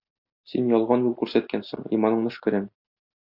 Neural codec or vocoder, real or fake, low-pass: none; real; 5.4 kHz